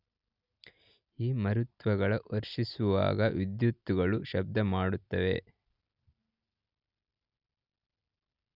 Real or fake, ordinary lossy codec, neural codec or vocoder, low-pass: real; none; none; 5.4 kHz